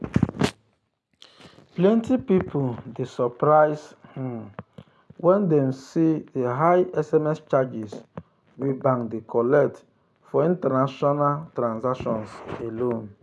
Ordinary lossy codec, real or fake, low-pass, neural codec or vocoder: none; real; none; none